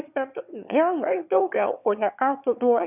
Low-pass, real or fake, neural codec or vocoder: 3.6 kHz; fake; autoencoder, 22.05 kHz, a latent of 192 numbers a frame, VITS, trained on one speaker